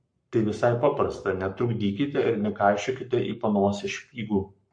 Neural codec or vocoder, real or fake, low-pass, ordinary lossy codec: codec, 44.1 kHz, 7.8 kbps, Pupu-Codec; fake; 9.9 kHz; MP3, 48 kbps